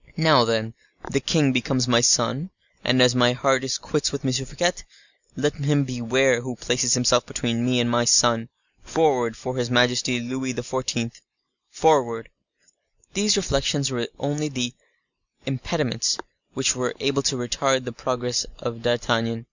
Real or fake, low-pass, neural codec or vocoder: real; 7.2 kHz; none